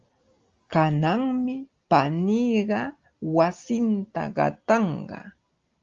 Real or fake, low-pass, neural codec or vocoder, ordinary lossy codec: real; 7.2 kHz; none; Opus, 32 kbps